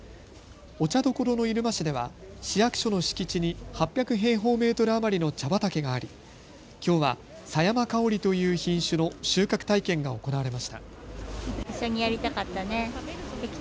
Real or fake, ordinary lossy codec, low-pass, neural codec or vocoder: real; none; none; none